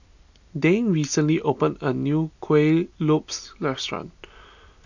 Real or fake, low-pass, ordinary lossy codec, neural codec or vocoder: real; 7.2 kHz; none; none